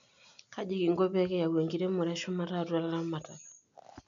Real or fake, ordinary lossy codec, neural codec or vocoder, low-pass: real; none; none; 7.2 kHz